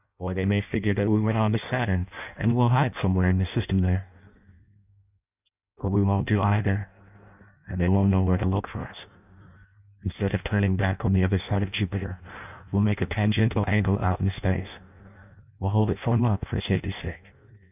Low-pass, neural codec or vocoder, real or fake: 3.6 kHz; codec, 16 kHz in and 24 kHz out, 0.6 kbps, FireRedTTS-2 codec; fake